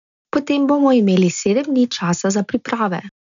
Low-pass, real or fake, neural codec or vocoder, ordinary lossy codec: 7.2 kHz; real; none; none